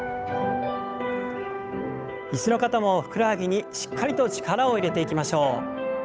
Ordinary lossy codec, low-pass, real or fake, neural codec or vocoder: none; none; fake; codec, 16 kHz, 8 kbps, FunCodec, trained on Chinese and English, 25 frames a second